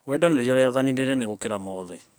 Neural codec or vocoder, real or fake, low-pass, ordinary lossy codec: codec, 44.1 kHz, 2.6 kbps, SNAC; fake; none; none